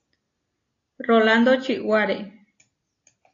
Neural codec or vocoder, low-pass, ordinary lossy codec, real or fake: none; 7.2 kHz; AAC, 32 kbps; real